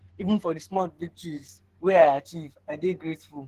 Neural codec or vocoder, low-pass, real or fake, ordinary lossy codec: codec, 44.1 kHz, 2.6 kbps, SNAC; 14.4 kHz; fake; Opus, 16 kbps